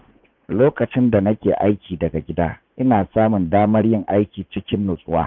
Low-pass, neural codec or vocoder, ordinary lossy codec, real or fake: 7.2 kHz; vocoder, 44.1 kHz, 80 mel bands, Vocos; none; fake